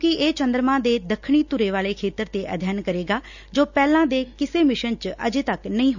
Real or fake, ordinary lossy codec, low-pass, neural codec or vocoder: real; none; 7.2 kHz; none